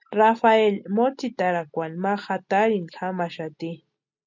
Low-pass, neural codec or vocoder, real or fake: 7.2 kHz; none; real